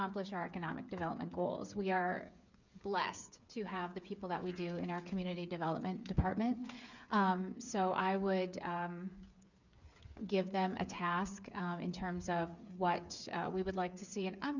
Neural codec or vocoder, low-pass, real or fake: codec, 16 kHz, 8 kbps, FreqCodec, smaller model; 7.2 kHz; fake